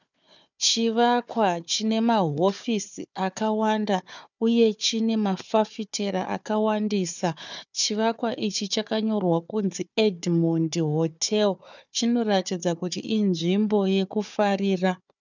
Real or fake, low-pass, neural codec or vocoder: fake; 7.2 kHz; codec, 16 kHz, 4 kbps, FunCodec, trained on Chinese and English, 50 frames a second